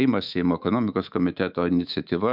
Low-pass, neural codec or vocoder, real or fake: 5.4 kHz; codec, 24 kHz, 3.1 kbps, DualCodec; fake